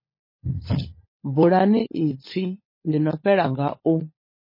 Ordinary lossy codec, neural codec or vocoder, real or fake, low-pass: MP3, 24 kbps; codec, 16 kHz, 16 kbps, FunCodec, trained on LibriTTS, 50 frames a second; fake; 5.4 kHz